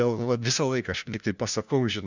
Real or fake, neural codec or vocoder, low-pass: fake; codec, 16 kHz, 1 kbps, FunCodec, trained on LibriTTS, 50 frames a second; 7.2 kHz